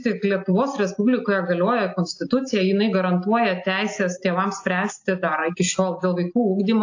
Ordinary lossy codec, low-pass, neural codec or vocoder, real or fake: AAC, 48 kbps; 7.2 kHz; none; real